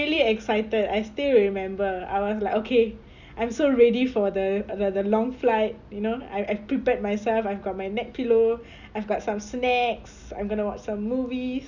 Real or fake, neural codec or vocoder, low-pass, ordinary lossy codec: real; none; 7.2 kHz; Opus, 64 kbps